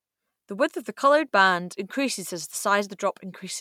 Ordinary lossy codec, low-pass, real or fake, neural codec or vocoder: MP3, 96 kbps; 19.8 kHz; real; none